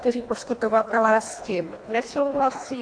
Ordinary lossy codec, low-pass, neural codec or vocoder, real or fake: AAC, 48 kbps; 9.9 kHz; codec, 24 kHz, 1.5 kbps, HILCodec; fake